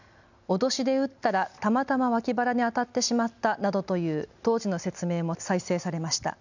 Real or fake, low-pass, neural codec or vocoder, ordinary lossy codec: real; 7.2 kHz; none; none